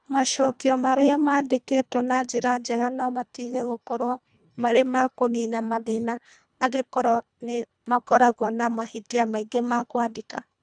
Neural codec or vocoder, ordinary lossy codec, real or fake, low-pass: codec, 24 kHz, 1.5 kbps, HILCodec; none; fake; 9.9 kHz